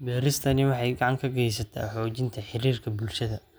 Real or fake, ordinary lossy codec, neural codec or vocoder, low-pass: real; none; none; none